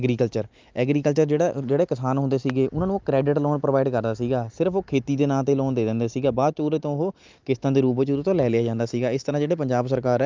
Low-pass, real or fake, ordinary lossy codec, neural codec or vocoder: 7.2 kHz; real; Opus, 24 kbps; none